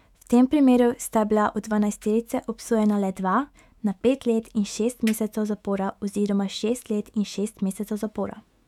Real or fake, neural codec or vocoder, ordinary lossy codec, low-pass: fake; autoencoder, 48 kHz, 128 numbers a frame, DAC-VAE, trained on Japanese speech; none; 19.8 kHz